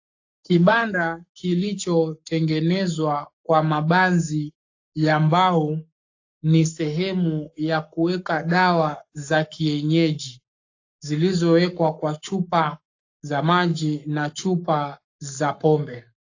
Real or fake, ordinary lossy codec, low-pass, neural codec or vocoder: fake; AAC, 48 kbps; 7.2 kHz; codec, 44.1 kHz, 7.8 kbps, Pupu-Codec